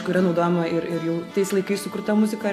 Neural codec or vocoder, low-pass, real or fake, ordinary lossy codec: none; 14.4 kHz; real; AAC, 64 kbps